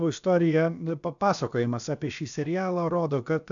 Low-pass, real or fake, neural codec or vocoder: 7.2 kHz; fake; codec, 16 kHz, about 1 kbps, DyCAST, with the encoder's durations